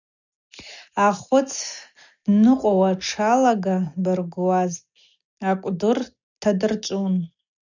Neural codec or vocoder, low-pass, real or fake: none; 7.2 kHz; real